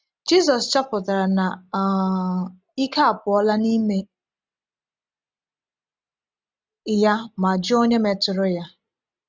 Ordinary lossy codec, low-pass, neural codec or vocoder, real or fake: none; none; none; real